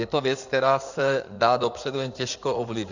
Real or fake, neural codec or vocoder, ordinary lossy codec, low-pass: fake; codec, 16 kHz in and 24 kHz out, 2.2 kbps, FireRedTTS-2 codec; Opus, 64 kbps; 7.2 kHz